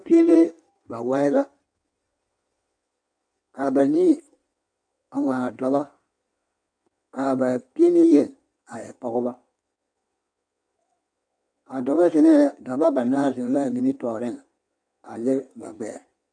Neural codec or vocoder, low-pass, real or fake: codec, 16 kHz in and 24 kHz out, 1.1 kbps, FireRedTTS-2 codec; 9.9 kHz; fake